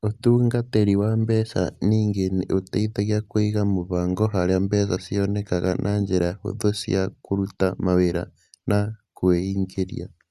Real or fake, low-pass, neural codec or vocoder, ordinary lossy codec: real; 14.4 kHz; none; Opus, 64 kbps